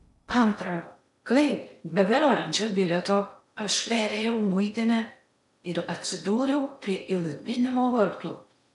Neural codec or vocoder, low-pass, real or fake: codec, 16 kHz in and 24 kHz out, 0.6 kbps, FocalCodec, streaming, 2048 codes; 10.8 kHz; fake